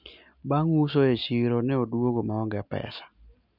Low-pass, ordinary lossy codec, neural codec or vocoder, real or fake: 5.4 kHz; none; none; real